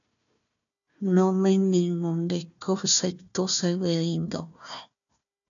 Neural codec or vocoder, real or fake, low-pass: codec, 16 kHz, 1 kbps, FunCodec, trained on Chinese and English, 50 frames a second; fake; 7.2 kHz